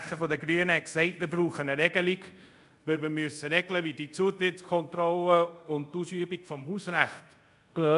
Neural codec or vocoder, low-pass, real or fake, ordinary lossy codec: codec, 24 kHz, 0.5 kbps, DualCodec; 10.8 kHz; fake; none